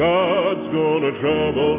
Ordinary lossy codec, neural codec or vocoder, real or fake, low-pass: AAC, 16 kbps; none; real; 3.6 kHz